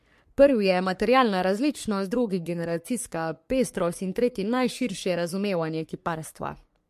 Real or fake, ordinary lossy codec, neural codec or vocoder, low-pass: fake; MP3, 64 kbps; codec, 44.1 kHz, 3.4 kbps, Pupu-Codec; 14.4 kHz